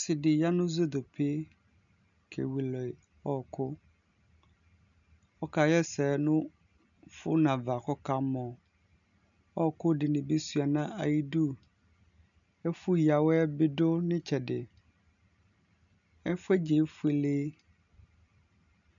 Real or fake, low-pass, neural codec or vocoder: real; 7.2 kHz; none